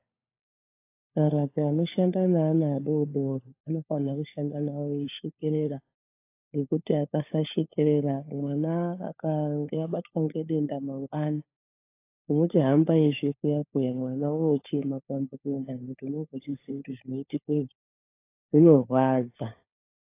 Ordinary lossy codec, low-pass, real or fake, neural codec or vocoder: AAC, 24 kbps; 3.6 kHz; fake; codec, 16 kHz, 4 kbps, FunCodec, trained on LibriTTS, 50 frames a second